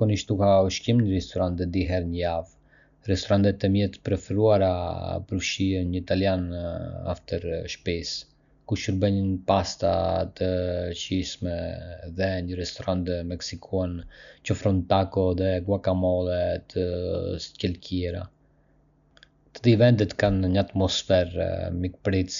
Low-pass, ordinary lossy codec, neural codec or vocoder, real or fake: 7.2 kHz; none; none; real